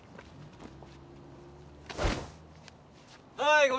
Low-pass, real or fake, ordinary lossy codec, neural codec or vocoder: none; real; none; none